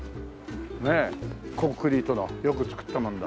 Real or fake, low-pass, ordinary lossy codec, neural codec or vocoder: real; none; none; none